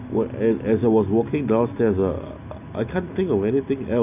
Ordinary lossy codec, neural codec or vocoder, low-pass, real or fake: none; none; 3.6 kHz; real